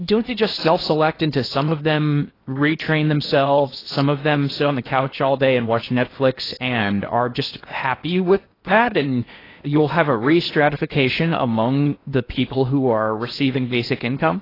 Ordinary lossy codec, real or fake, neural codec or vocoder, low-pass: AAC, 24 kbps; fake; codec, 16 kHz in and 24 kHz out, 0.8 kbps, FocalCodec, streaming, 65536 codes; 5.4 kHz